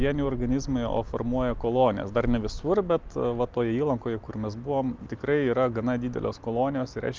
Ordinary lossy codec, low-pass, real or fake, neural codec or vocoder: Opus, 32 kbps; 7.2 kHz; real; none